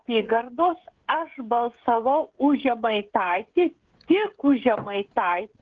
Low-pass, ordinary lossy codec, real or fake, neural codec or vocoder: 7.2 kHz; Opus, 16 kbps; fake; codec, 16 kHz, 16 kbps, FreqCodec, smaller model